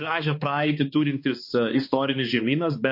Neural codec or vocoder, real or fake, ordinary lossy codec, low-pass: codec, 16 kHz, 2 kbps, X-Codec, HuBERT features, trained on balanced general audio; fake; MP3, 32 kbps; 5.4 kHz